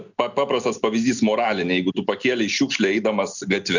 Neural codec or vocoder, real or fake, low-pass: none; real; 7.2 kHz